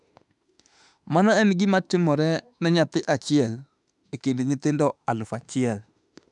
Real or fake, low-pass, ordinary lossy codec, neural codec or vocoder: fake; 10.8 kHz; none; autoencoder, 48 kHz, 32 numbers a frame, DAC-VAE, trained on Japanese speech